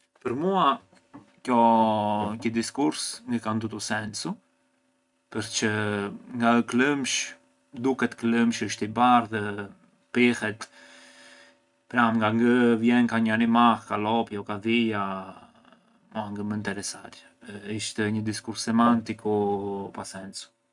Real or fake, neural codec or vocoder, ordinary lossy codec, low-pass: real; none; none; 10.8 kHz